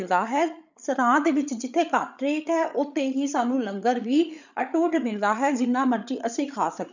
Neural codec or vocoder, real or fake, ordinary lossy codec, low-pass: codec, 16 kHz, 8 kbps, FreqCodec, larger model; fake; none; 7.2 kHz